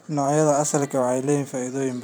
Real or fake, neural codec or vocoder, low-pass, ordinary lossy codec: fake; vocoder, 44.1 kHz, 128 mel bands every 512 samples, BigVGAN v2; none; none